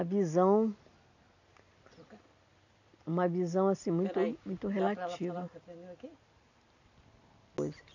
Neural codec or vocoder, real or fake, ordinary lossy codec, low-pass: none; real; none; 7.2 kHz